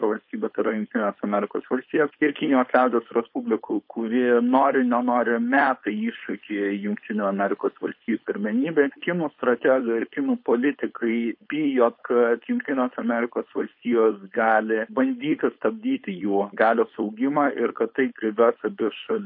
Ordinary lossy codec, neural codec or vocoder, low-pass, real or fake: MP3, 32 kbps; codec, 16 kHz, 4.8 kbps, FACodec; 5.4 kHz; fake